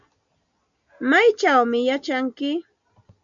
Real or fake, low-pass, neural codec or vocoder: real; 7.2 kHz; none